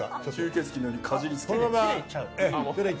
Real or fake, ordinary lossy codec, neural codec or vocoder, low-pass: real; none; none; none